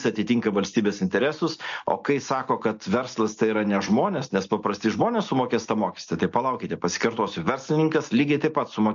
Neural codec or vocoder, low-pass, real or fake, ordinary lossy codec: none; 7.2 kHz; real; MP3, 48 kbps